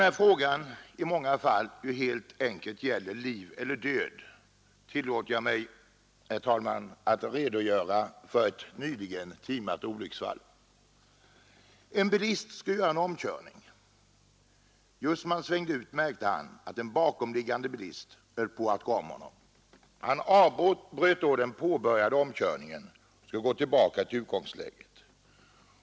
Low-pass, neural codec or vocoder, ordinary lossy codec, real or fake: none; none; none; real